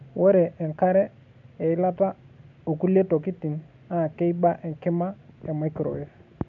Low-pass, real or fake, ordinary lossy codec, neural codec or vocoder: 7.2 kHz; real; none; none